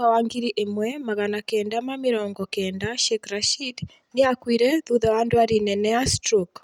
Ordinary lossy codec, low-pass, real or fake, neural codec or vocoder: none; 19.8 kHz; real; none